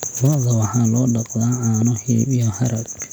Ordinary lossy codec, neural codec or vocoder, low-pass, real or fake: none; none; none; real